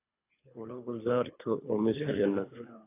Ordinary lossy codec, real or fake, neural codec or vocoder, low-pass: AAC, 24 kbps; fake; codec, 24 kHz, 3 kbps, HILCodec; 3.6 kHz